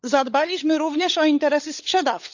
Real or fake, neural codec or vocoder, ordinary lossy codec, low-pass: fake; codec, 16 kHz, 16 kbps, FunCodec, trained on LibriTTS, 50 frames a second; none; 7.2 kHz